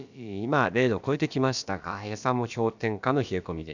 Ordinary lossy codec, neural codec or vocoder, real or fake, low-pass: none; codec, 16 kHz, about 1 kbps, DyCAST, with the encoder's durations; fake; 7.2 kHz